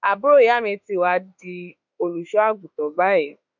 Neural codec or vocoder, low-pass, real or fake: autoencoder, 48 kHz, 32 numbers a frame, DAC-VAE, trained on Japanese speech; 7.2 kHz; fake